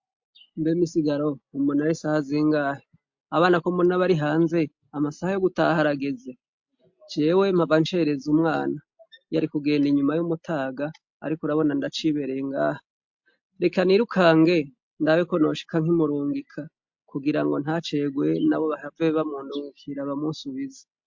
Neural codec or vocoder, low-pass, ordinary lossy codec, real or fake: none; 7.2 kHz; MP3, 48 kbps; real